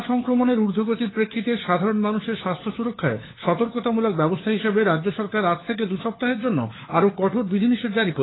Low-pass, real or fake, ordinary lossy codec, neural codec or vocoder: 7.2 kHz; fake; AAC, 16 kbps; codec, 16 kHz, 4 kbps, FunCodec, trained on LibriTTS, 50 frames a second